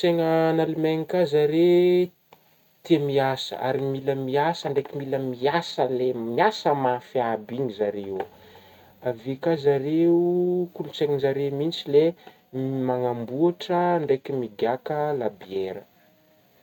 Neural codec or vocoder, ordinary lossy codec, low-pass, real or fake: none; none; 19.8 kHz; real